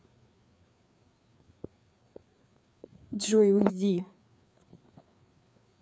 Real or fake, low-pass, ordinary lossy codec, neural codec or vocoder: fake; none; none; codec, 16 kHz, 4 kbps, FreqCodec, larger model